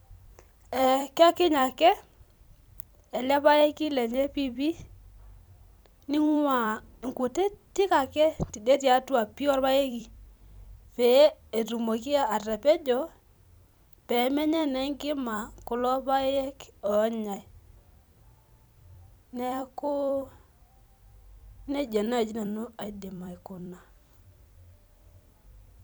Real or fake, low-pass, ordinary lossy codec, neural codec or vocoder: fake; none; none; vocoder, 44.1 kHz, 128 mel bands every 256 samples, BigVGAN v2